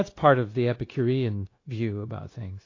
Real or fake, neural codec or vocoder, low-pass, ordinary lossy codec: fake; codec, 16 kHz, 0.9 kbps, LongCat-Audio-Codec; 7.2 kHz; AAC, 32 kbps